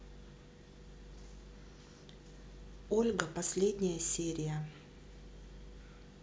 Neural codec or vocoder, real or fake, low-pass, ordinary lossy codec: none; real; none; none